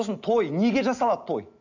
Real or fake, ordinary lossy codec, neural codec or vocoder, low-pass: real; none; none; 7.2 kHz